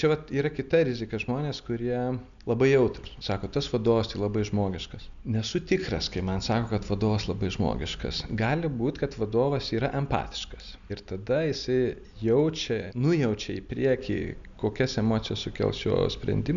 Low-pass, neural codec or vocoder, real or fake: 7.2 kHz; none; real